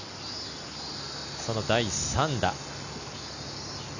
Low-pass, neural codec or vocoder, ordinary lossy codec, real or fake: 7.2 kHz; none; none; real